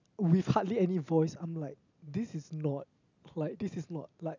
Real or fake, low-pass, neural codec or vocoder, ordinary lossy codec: real; 7.2 kHz; none; none